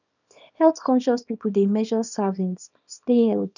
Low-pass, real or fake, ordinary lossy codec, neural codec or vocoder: 7.2 kHz; fake; none; codec, 24 kHz, 0.9 kbps, WavTokenizer, small release